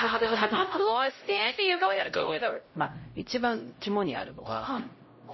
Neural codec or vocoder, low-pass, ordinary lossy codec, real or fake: codec, 16 kHz, 0.5 kbps, X-Codec, HuBERT features, trained on LibriSpeech; 7.2 kHz; MP3, 24 kbps; fake